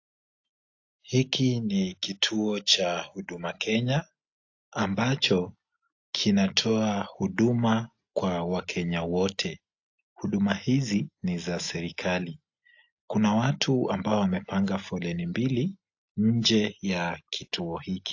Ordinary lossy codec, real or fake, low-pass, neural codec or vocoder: AAC, 48 kbps; real; 7.2 kHz; none